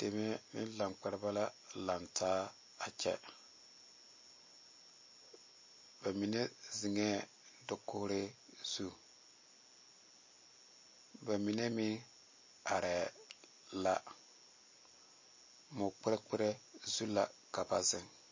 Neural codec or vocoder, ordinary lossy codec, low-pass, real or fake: none; MP3, 32 kbps; 7.2 kHz; real